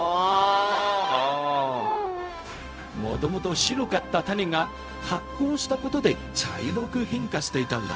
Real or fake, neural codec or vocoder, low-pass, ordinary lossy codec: fake; codec, 16 kHz, 0.4 kbps, LongCat-Audio-Codec; none; none